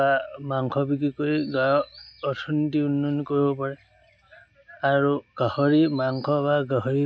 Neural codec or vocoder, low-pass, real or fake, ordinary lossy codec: none; none; real; none